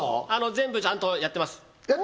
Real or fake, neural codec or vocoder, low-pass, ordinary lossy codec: real; none; none; none